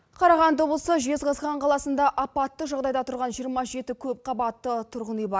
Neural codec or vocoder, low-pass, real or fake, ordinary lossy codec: none; none; real; none